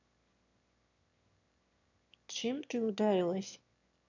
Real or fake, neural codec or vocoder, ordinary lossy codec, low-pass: fake; autoencoder, 22.05 kHz, a latent of 192 numbers a frame, VITS, trained on one speaker; none; 7.2 kHz